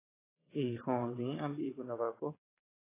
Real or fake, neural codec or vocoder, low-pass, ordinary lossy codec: fake; vocoder, 22.05 kHz, 80 mel bands, Vocos; 3.6 kHz; AAC, 16 kbps